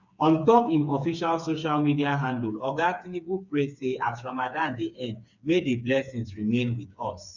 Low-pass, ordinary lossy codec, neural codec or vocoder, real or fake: 7.2 kHz; none; codec, 16 kHz, 4 kbps, FreqCodec, smaller model; fake